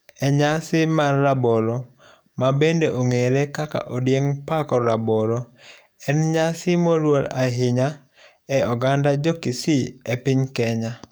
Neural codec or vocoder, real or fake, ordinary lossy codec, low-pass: codec, 44.1 kHz, 7.8 kbps, DAC; fake; none; none